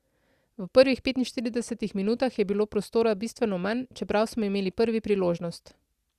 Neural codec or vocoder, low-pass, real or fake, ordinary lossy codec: none; 14.4 kHz; real; Opus, 64 kbps